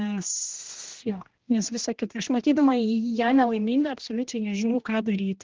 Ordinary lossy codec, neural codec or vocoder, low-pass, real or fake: Opus, 16 kbps; codec, 16 kHz, 1 kbps, X-Codec, HuBERT features, trained on general audio; 7.2 kHz; fake